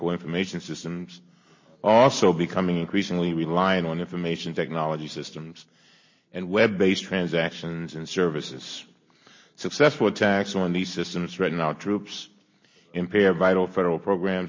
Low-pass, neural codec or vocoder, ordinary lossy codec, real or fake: 7.2 kHz; none; MP3, 32 kbps; real